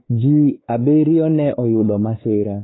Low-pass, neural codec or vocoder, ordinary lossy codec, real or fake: 7.2 kHz; codec, 16 kHz, 4 kbps, X-Codec, WavLM features, trained on Multilingual LibriSpeech; AAC, 16 kbps; fake